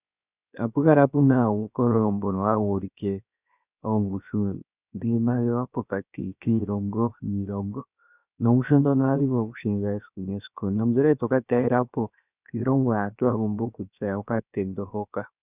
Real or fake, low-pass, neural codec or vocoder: fake; 3.6 kHz; codec, 16 kHz, 0.7 kbps, FocalCodec